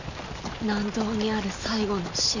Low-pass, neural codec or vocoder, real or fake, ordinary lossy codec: 7.2 kHz; none; real; none